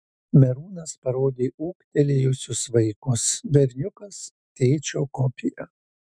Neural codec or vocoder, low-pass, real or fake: autoencoder, 48 kHz, 128 numbers a frame, DAC-VAE, trained on Japanese speech; 9.9 kHz; fake